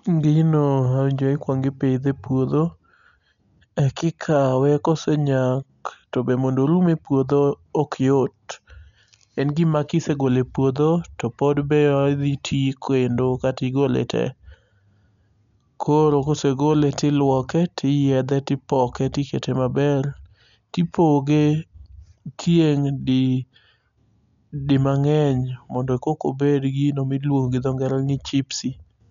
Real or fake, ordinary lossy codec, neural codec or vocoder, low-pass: real; none; none; 7.2 kHz